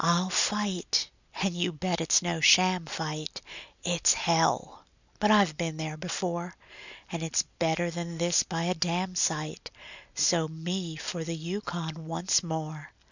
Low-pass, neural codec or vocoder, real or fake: 7.2 kHz; none; real